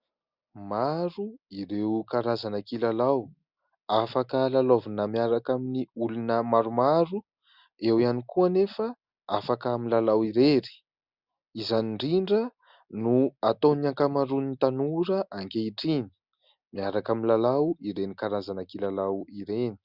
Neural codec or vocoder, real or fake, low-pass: none; real; 5.4 kHz